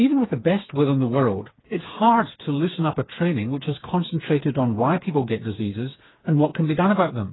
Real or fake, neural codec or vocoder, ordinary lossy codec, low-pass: fake; codec, 16 kHz, 4 kbps, FreqCodec, smaller model; AAC, 16 kbps; 7.2 kHz